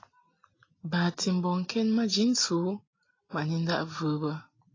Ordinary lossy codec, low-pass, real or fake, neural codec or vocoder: AAC, 32 kbps; 7.2 kHz; real; none